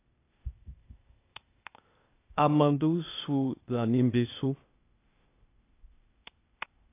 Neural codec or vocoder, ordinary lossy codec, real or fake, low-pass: codec, 16 kHz, 0.8 kbps, ZipCodec; AAC, 24 kbps; fake; 3.6 kHz